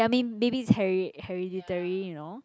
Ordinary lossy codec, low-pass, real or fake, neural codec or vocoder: none; none; real; none